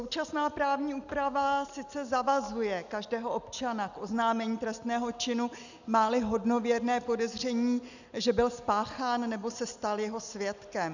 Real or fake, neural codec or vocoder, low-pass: real; none; 7.2 kHz